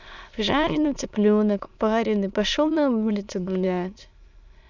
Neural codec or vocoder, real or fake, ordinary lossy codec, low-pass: autoencoder, 22.05 kHz, a latent of 192 numbers a frame, VITS, trained on many speakers; fake; none; 7.2 kHz